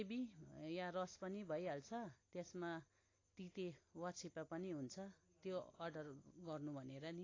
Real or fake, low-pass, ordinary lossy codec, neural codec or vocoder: real; 7.2 kHz; AAC, 32 kbps; none